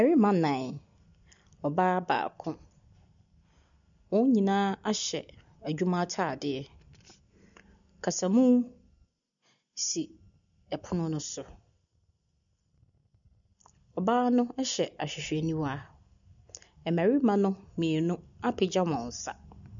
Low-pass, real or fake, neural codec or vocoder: 7.2 kHz; real; none